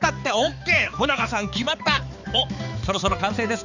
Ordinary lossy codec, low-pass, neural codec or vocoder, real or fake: none; 7.2 kHz; codec, 16 kHz, 4 kbps, X-Codec, HuBERT features, trained on balanced general audio; fake